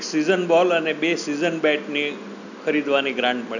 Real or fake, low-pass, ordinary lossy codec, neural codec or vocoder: real; 7.2 kHz; none; none